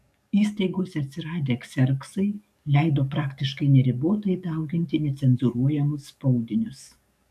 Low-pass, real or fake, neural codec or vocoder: 14.4 kHz; fake; codec, 44.1 kHz, 7.8 kbps, DAC